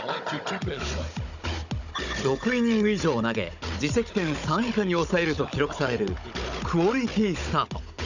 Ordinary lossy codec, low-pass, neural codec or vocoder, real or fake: none; 7.2 kHz; codec, 16 kHz, 16 kbps, FunCodec, trained on Chinese and English, 50 frames a second; fake